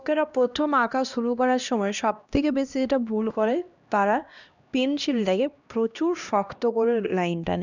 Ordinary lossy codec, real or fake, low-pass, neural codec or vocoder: none; fake; 7.2 kHz; codec, 16 kHz, 1 kbps, X-Codec, HuBERT features, trained on LibriSpeech